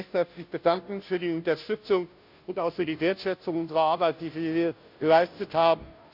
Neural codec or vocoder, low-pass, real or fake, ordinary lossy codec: codec, 16 kHz, 0.5 kbps, FunCodec, trained on Chinese and English, 25 frames a second; 5.4 kHz; fake; none